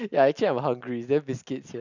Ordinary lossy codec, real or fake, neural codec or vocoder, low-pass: none; real; none; 7.2 kHz